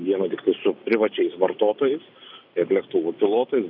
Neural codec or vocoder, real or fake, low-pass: none; real; 5.4 kHz